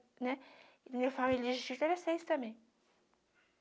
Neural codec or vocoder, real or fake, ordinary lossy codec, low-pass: none; real; none; none